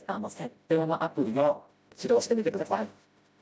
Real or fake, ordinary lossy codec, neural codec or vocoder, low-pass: fake; none; codec, 16 kHz, 0.5 kbps, FreqCodec, smaller model; none